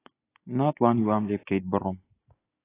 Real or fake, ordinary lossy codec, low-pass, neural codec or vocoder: real; AAC, 16 kbps; 3.6 kHz; none